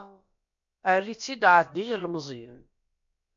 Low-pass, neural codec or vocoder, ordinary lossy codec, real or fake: 7.2 kHz; codec, 16 kHz, about 1 kbps, DyCAST, with the encoder's durations; MP3, 48 kbps; fake